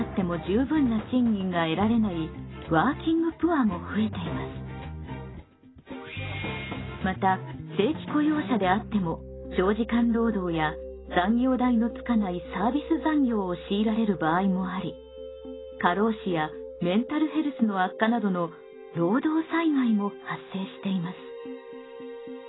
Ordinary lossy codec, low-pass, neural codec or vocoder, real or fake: AAC, 16 kbps; 7.2 kHz; none; real